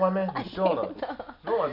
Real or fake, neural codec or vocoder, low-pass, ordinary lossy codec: real; none; 5.4 kHz; none